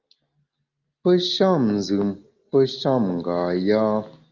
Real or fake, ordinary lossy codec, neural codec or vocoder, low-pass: real; Opus, 24 kbps; none; 7.2 kHz